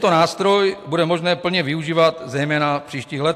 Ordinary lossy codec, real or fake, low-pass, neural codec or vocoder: AAC, 64 kbps; real; 14.4 kHz; none